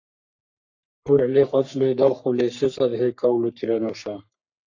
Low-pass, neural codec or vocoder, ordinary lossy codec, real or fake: 7.2 kHz; codec, 44.1 kHz, 3.4 kbps, Pupu-Codec; AAC, 48 kbps; fake